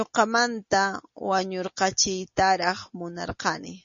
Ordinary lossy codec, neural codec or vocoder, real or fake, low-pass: MP3, 32 kbps; none; real; 7.2 kHz